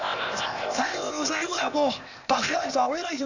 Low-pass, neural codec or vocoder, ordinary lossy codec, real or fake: 7.2 kHz; codec, 16 kHz, 0.8 kbps, ZipCodec; none; fake